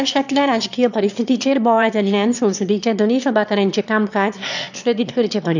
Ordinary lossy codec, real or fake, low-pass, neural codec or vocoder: none; fake; 7.2 kHz; autoencoder, 22.05 kHz, a latent of 192 numbers a frame, VITS, trained on one speaker